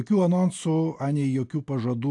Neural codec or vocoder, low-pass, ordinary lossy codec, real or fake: none; 10.8 kHz; AAC, 64 kbps; real